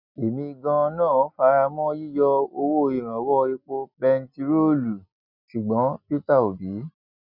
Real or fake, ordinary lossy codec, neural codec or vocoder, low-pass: real; none; none; 5.4 kHz